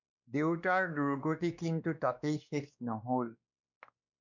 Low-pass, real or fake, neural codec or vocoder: 7.2 kHz; fake; codec, 16 kHz, 2 kbps, X-Codec, WavLM features, trained on Multilingual LibriSpeech